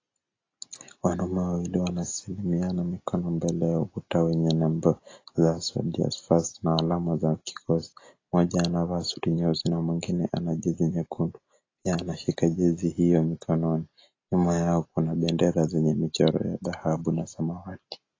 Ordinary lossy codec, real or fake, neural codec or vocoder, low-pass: AAC, 32 kbps; real; none; 7.2 kHz